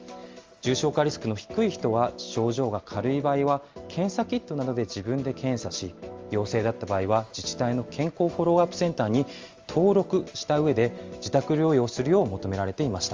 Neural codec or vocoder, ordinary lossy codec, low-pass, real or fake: none; Opus, 32 kbps; 7.2 kHz; real